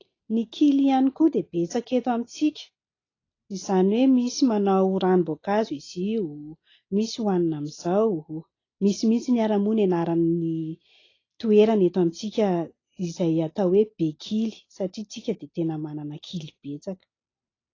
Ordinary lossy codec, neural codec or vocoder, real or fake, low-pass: AAC, 32 kbps; none; real; 7.2 kHz